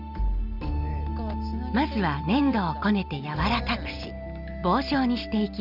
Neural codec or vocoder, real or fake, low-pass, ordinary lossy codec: none; real; 5.4 kHz; none